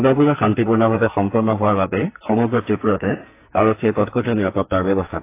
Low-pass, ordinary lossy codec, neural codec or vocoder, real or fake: 3.6 kHz; AAC, 24 kbps; codec, 44.1 kHz, 2.6 kbps, SNAC; fake